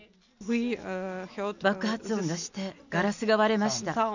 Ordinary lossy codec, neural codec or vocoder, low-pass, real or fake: none; none; 7.2 kHz; real